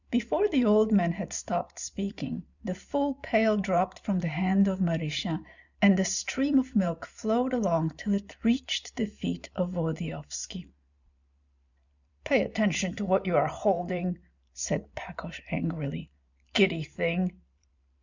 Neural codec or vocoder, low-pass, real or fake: vocoder, 44.1 kHz, 128 mel bands every 512 samples, BigVGAN v2; 7.2 kHz; fake